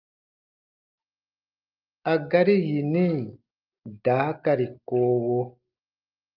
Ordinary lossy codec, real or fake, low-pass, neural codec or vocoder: Opus, 24 kbps; real; 5.4 kHz; none